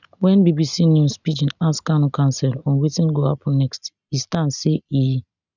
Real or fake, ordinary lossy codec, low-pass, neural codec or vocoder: real; none; 7.2 kHz; none